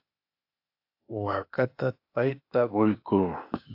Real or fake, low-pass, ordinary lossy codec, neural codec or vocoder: fake; 5.4 kHz; AAC, 48 kbps; codec, 16 kHz, 0.8 kbps, ZipCodec